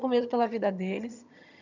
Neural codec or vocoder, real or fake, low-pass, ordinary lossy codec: vocoder, 22.05 kHz, 80 mel bands, HiFi-GAN; fake; 7.2 kHz; none